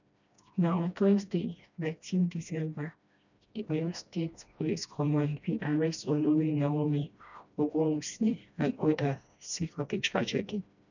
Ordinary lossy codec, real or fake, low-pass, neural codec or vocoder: none; fake; 7.2 kHz; codec, 16 kHz, 1 kbps, FreqCodec, smaller model